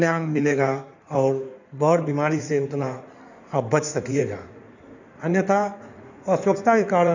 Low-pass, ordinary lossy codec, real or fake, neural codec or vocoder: 7.2 kHz; none; fake; codec, 16 kHz in and 24 kHz out, 2.2 kbps, FireRedTTS-2 codec